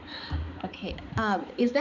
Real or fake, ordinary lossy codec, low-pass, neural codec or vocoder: fake; none; 7.2 kHz; codec, 16 kHz, 4 kbps, X-Codec, HuBERT features, trained on balanced general audio